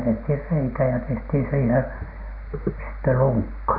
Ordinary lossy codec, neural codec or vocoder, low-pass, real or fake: none; none; 5.4 kHz; real